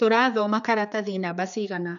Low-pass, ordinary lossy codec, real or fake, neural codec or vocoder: 7.2 kHz; none; fake; codec, 16 kHz, 4 kbps, X-Codec, HuBERT features, trained on general audio